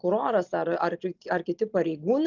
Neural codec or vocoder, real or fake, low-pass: none; real; 7.2 kHz